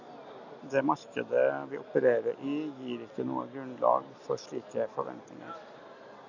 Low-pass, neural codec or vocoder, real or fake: 7.2 kHz; none; real